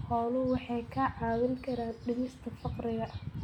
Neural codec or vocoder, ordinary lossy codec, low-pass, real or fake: none; none; 19.8 kHz; real